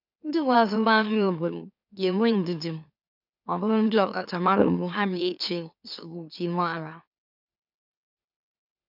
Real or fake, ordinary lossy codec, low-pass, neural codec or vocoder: fake; none; 5.4 kHz; autoencoder, 44.1 kHz, a latent of 192 numbers a frame, MeloTTS